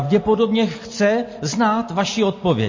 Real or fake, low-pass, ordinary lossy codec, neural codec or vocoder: real; 7.2 kHz; MP3, 32 kbps; none